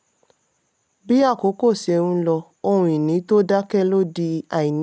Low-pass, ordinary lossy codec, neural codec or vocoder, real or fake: none; none; none; real